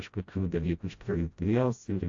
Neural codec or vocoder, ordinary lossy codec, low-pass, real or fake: codec, 16 kHz, 0.5 kbps, FreqCodec, smaller model; AAC, 48 kbps; 7.2 kHz; fake